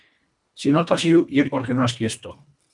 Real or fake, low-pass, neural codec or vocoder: fake; 10.8 kHz; codec, 24 kHz, 1.5 kbps, HILCodec